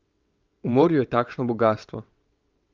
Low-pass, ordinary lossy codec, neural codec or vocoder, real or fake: 7.2 kHz; Opus, 24 kbps; vocoder, 22.05 kHz, 80 mel bands, WaveNeXt; fake